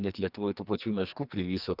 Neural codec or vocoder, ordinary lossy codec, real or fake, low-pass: codec, 44.1 kHz, 2.6 kbps, SNAC; Opus, 24 kbps; fake; 5.4 kHz